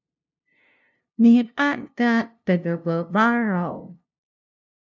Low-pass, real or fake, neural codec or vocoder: 7.2 kHz; fake; codec, 16 kHz, 0.5 kbps, FunCodec, trained on LibriTTS, 25 frames a second